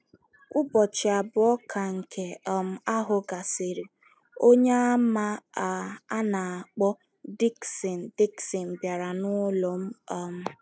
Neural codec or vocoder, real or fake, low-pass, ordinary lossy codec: none; real; none; none